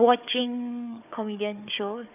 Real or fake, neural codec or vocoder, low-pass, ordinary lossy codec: fake; codec, 16 kHz, 4 kbps, FunCodec, trained on Chinese and English, 50 frames a second; 3.6 kHz; none